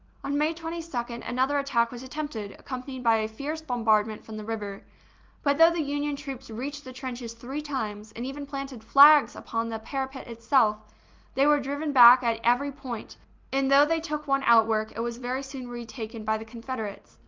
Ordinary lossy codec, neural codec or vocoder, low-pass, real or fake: Opus, 32 kbps; none; 7.2 kHz; real